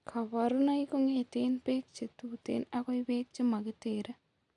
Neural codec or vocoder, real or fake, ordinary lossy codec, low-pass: none; real; none; 10.8 kHz